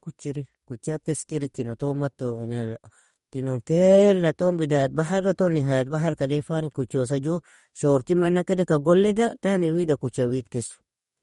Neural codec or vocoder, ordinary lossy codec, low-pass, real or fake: codec, 44.1 kHz, 2.6 kbps, DAC; MP3, 48 kbps; 19.8 kHz; fake